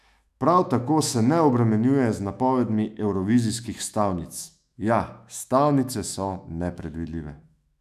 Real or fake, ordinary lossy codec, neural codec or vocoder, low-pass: fake; none; autoencoder, 48 kHz, 128 numbers a frame, DAC-VAE, trained on Japanese speech; 14.4 kHz